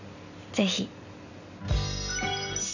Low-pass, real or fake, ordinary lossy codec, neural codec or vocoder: 7.2 kHz; real; none; none